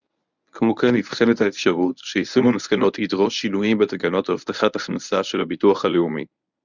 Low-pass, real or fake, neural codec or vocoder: 7.2 kHz; fake; codec, 24 kHz, 0.9 kbps, WavTokenizer, medium speech release version 1